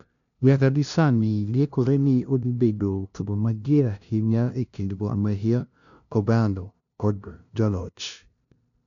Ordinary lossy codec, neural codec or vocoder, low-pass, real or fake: none; codec, 16 kHz, 0.5 kbps, FunCodec, trained on LibriTTS, 25 frames a second; 7.2 kHz; fake